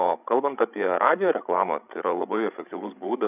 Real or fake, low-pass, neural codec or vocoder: fake; 3.6 kHz; codec, 16 kHz, 16 kbps, FreqCodec, larger model